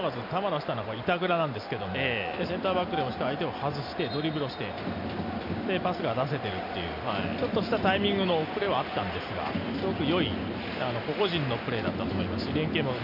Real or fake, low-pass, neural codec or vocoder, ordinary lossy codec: real; 5.4 kHz; none; MP3, 48 kbps